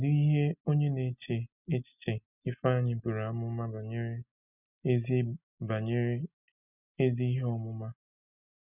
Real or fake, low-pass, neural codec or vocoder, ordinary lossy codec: real; 3.6 kHz; none; none